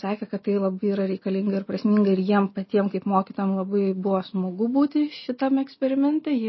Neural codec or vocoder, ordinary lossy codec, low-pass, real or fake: none; MP3, 24 kbps; 7.2 kHz; real